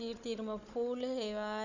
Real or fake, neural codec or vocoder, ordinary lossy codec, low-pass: fake; codec, 16 kHz, 16 kbps, FunCodec, trained on Chinese and English, 50 frames a second; none; 7.2 kHz